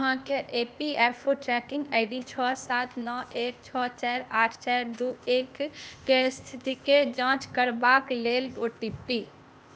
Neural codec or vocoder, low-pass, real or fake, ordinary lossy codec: codec, 16 kHz, 0.8 kbps, ZipCodec; none; fake; none